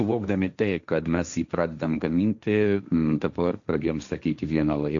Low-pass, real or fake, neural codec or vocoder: 7.2 kHz; fake; codec, 16 kHz, 1.1 kbps, Voila-Tokenizer